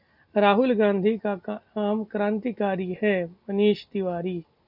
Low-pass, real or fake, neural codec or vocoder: 5.4 kHz; real; none